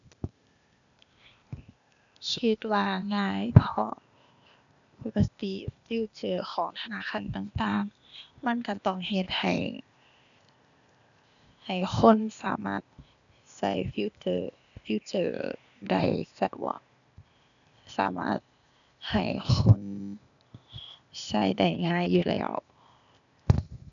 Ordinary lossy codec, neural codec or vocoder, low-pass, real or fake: none; codec, 16 kHz, 0.8 kbps, ZipCodec; 7.2 kHz; fake